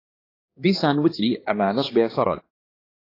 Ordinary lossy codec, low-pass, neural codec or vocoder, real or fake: AAC, 24 kbps; 5.4 kHz; codec, 16 kHz, 2 kbps, X-Codec, HuBERT features, trained on balanced general audio; fake